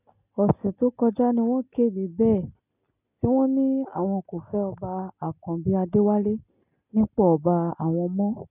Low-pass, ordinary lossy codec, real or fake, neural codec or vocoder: 3.6 kHz; none; real; none